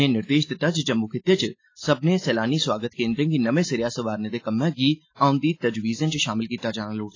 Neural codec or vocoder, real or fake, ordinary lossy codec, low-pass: none; real; AAC, 32 kbps; 7.2 kHz